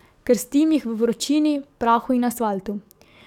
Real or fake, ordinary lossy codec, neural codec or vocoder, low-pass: fake; none; codec, 44.1 kHz, 7.8 kbps, DAC; 19.8 kHz